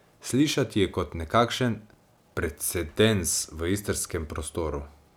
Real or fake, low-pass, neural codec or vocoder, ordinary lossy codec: real; none; none; none